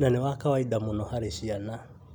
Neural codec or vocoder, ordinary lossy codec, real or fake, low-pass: none; none; real; 19.8 kHz